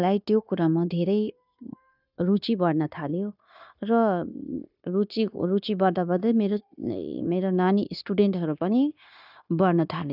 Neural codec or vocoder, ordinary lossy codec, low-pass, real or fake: codec, 16 kHz, 0.9 kbps, LongCat-Audio-Codec; none; 5.4 kHz; fake